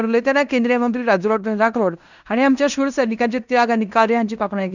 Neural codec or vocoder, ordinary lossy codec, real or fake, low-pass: codec, 16 kHz in and 24 kHz out, 0.9 kbps, LongCat-Audio-Codec, fine tuned four codebook decoder; none; fake; 7.2 kHz